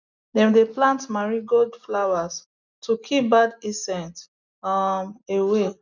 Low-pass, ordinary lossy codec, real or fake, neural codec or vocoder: 7.2 kHz; none; real; none